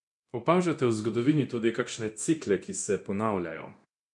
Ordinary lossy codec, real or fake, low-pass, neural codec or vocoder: none; fake; 10.8 kHz; codec, 24 kHz, 0.9 kbps, DualCodec